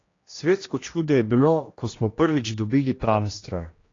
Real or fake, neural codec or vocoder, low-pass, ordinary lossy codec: fake; codec, 16 kHz, 1 kbps, X-Codec, HuBERT features, trained on general audio; 7.2 kHz; AAC, 32 kbps